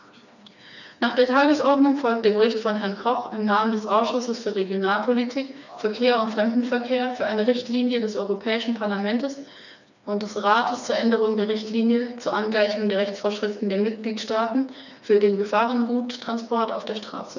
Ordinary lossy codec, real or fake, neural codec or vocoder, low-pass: none; fake; codec, 16 kHz, 2 kbps, FreqCodec, smaller model; 7.2 kHz